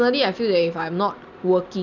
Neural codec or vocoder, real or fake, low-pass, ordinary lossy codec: none; real; 7.2 kHz; none